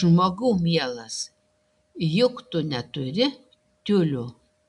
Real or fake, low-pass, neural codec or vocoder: real; 10.8 kHz; none